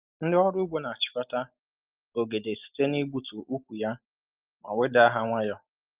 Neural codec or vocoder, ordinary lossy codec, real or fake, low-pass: none; Opus, 32 kbps; real; 3.6 kHz